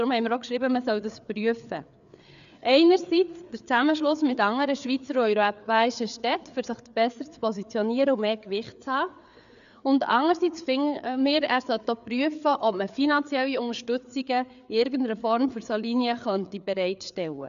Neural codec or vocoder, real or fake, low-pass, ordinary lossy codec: codec, 16 kHz, 4 kbps, FreqCodec, larger model; fake; 7.2 kHz; none